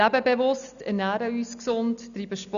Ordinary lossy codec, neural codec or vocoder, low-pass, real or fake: none; none; 7.2 kHz; real